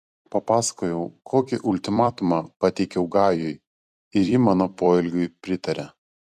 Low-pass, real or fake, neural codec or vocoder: 14.4 kHz; fake; vocoder, 44.1 kHz, 128 mel bands every 256 samples, BigVGAN v2